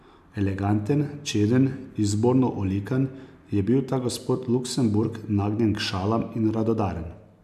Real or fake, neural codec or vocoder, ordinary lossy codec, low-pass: real; none; none; 14.4 kHz